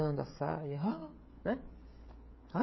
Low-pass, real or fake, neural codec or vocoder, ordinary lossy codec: 7.2 kHz; fake; codec, 16 kHz, 16 kbps, FreqCodec, smaller model; MP3, 24 kbps